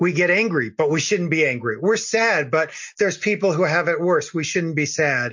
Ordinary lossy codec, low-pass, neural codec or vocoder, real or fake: MP3, 48 kbps; 7.2 kHz; none; real